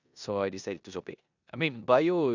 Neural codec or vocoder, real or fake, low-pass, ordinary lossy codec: codec, 16 kHz in and 24 kHz out, 0.9 kbps, LongCat-Audio-Codec, four codebook decoder; fake; 7.2 kHz; none